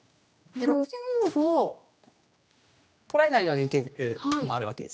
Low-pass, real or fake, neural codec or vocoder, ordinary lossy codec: none; fake; codec, 16 kHz, 1 kbps, X-Codec, HuBERT features, trained on general audio; none